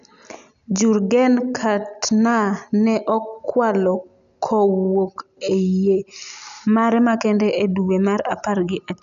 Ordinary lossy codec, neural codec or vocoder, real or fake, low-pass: none; none; real; 7.2 kHz